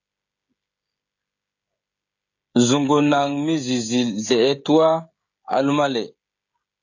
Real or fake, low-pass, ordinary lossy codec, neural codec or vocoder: fake; 7.2 kHz; AAC, 48 kbps; codec, 16 kHz, 16 kbps, FreqCodec, smaller model